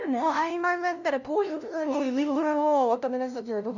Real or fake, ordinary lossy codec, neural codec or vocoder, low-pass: fake; none; codec, 16 kHz, 0.5 kbps, FunCodec, trained on LibriTTS, 25 frames a second; 7.2 kHz